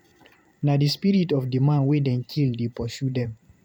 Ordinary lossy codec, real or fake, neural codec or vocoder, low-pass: none; fake; vocoder, 44.1 kHz, 128 mel bands every 512 samples, BigVGAN v2; 19.8 kHz